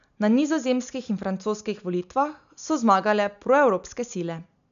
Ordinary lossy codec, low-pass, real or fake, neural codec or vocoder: none; 7.2 kHz; real; none